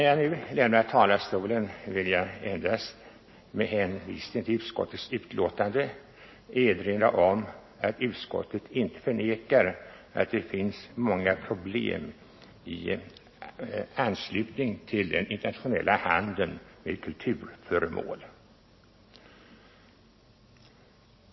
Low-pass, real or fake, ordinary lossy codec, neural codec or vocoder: 7.2 kHz; real; MP3, 24 kbps; none